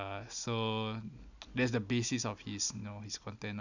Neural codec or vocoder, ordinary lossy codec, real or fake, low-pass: none; none; real; 7.2 kHz